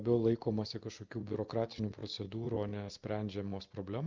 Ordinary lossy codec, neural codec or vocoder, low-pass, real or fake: Opus, 32 kbps; none; 7.2 kHz; real